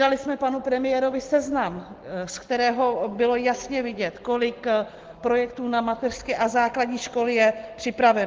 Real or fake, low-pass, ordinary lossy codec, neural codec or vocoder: real; 7.2 kHz; Opus, 16 kbps; none